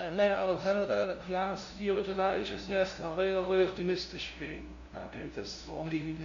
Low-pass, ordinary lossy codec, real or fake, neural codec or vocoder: 7.2 kHz; MP3, 64 kbps; fake; codec, 16 kHz, 0.5 kbps, FunCodec, trained on LibriTTS, 25 frames a second